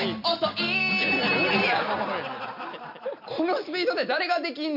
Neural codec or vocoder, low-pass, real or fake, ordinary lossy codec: none; 5.4 kHz; real; none